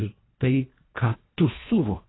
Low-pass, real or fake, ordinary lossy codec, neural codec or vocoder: 7.2 kHz; fake; AAC, 16 kbps; codec, 24 kHz, 1.5 kbps, HILCodec